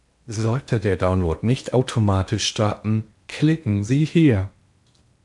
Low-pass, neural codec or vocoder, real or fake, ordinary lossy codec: 10.8 kHz; codec, 16 kHz in and 24 kHz out, 0.8 kbps, FocalCodec, streaming, 65536 codes; fake; MP3, 64 kbps